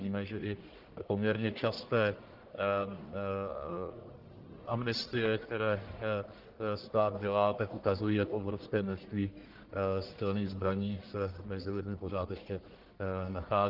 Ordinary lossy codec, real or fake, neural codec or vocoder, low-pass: Opus, 16 kbps; fake; codec, 44.1 kHz, 1.7 kbps, Pupu-Codec; 5.4 kHz